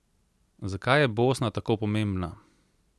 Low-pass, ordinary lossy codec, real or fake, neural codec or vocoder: none; none; real; none